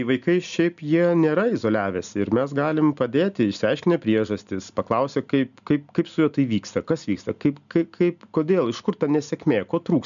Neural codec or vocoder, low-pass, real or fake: none; 7.2 kHz; real